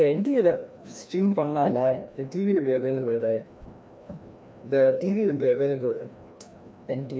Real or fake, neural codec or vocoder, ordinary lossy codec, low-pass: fake; codec, 16 kHz, 1 kbps, FreqCodec, larger model; none; none